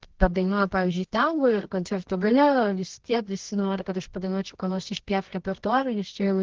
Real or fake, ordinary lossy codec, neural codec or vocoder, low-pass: fake; Opus, 16 kbps; codec, 24 kHz, 0.9 kbps, WavTokenizer, medium music audio release; 7.2 kHz